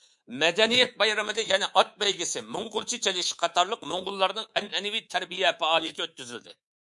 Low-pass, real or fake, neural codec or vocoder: 10.8 kHz; fake; codec, 24 kHz, 3.1 kbps, DualCodec